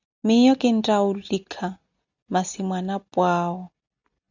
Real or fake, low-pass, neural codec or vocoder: real; 7.2 kHz; none